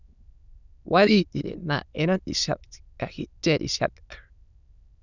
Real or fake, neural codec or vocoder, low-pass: fake; autoencoder, 22.05 kHz, a latent of 192 numbers a frame, VITS, trained on many speakers; 7.2 kHz